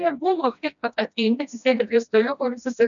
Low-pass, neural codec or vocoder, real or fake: 7.2 kHz; codec, 16 kHz, 1 kbps, FreqCodec, smaller model; fake